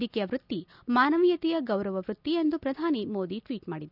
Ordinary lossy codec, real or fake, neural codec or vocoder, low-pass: none; real; none; 5.4 kHz